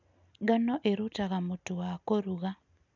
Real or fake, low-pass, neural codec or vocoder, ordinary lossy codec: real; 7.2 kHz; none; none